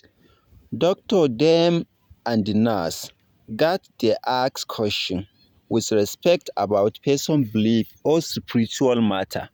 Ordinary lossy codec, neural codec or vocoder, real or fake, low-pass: none; vocoder, 44.1 kHz, 128 mel bands every 512 samples, BigVGAN v2; fake; 19.8 kHz